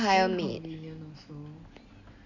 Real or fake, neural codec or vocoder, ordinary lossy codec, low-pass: real; none; none; 7.2 kHz